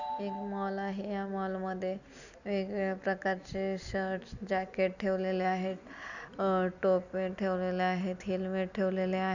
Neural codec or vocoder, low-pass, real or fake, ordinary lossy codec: none; 7.2 kHz; real; none